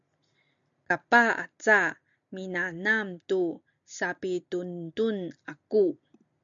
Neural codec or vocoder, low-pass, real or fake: none; 7.2 kHz; real